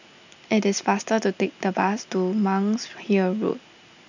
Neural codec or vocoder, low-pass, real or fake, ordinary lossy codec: none; 7.2 kHz; real; none